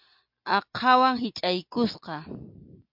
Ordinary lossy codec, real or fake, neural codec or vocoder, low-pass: MP3, 48 kbps; real; none; 5.4 kHz